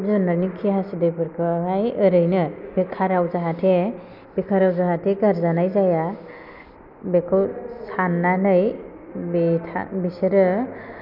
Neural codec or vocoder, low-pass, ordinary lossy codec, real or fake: none; 5.4 kHz; none; real